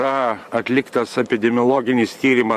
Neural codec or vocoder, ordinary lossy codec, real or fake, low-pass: vocoder, 44.1 kHz, 128 mel bands every 512 samples, BigVGAN v2; MP3, 64 kbps; fake; 14.4 kHz